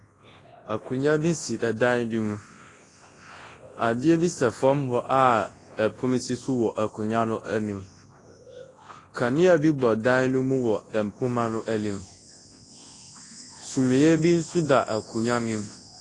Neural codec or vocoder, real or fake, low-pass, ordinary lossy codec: codec, 24 kHz, 0.9 kbps, WavTokenizer, large speech release; fake; 10.8 kHz; AAC, 32 kbps